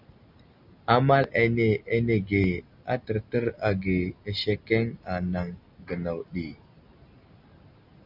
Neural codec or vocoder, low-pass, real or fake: none; 5.4 kHz; real